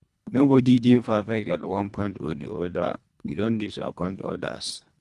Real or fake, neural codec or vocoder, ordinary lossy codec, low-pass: fake; codec, 24 kHz, 1.5 kbps, HILCodec; none; none